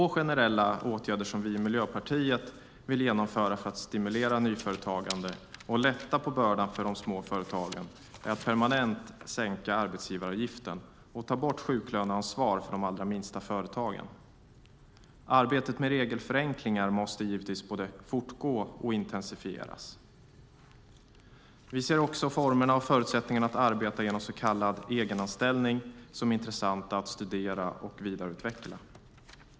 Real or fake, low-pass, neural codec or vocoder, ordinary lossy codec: real; none; none; none